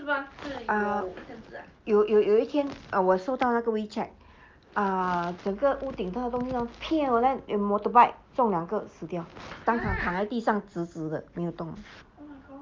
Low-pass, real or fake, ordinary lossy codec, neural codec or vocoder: 7.2 kHz; real; Opus, 32 kbps; none